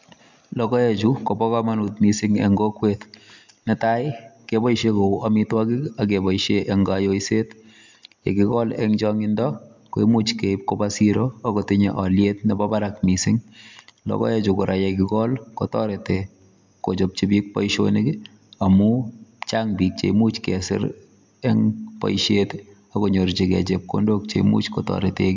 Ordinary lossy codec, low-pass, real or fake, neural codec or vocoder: MP3, 64 kbps; 7.2 kHz; real; none